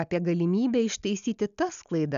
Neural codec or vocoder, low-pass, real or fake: none; 7.2 kHz; real